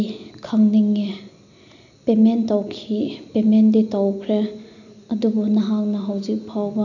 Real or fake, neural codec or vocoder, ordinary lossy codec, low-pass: real; none; none; 7.2 kHz